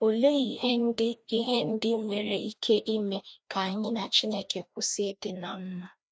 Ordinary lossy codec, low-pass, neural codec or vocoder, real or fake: none; none; codec, 16 kHz, 1 kbps, FreqCodec, larger model; fake